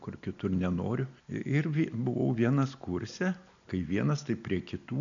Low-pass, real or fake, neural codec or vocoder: 7.2 kHz; real; none